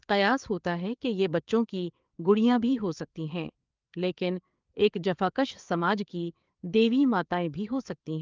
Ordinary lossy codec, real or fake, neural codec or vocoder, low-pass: Opus, 32 kbps; fake; codec, 16 kHz, 4 kbps, FreqCodec, larger model; 7.2 kHz